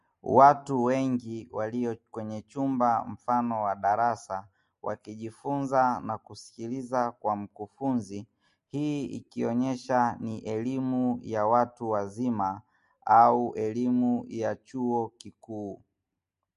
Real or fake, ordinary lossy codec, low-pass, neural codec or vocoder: real; MP3, 48 kbps; 14.4 kHz; none